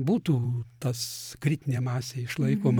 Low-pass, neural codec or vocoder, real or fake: 19.8 kHz; vocoder, 44.1 kHz, 128 mel bands every 512 samples, BigVGAN v2; fake